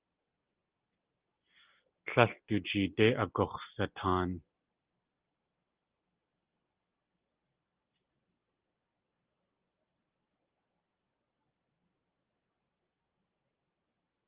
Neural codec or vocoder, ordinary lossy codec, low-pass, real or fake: none; Opus, 32 kbps; 3.6 kHz; real